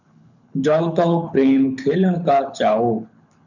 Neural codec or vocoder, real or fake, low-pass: codec, 24 kHz, 6 kbps, HILCodec; fake; 7.2 kHz